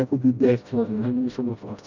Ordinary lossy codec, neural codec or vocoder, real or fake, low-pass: none; codec, 16 kHz, 0.5 kbps, FreqCodec, smaller model; fake; 7.2 kHz